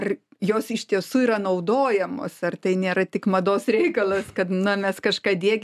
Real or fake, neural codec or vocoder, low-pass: real; none; 14.4 kHz